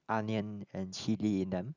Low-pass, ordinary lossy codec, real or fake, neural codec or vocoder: 7.2 kHz; none; real; none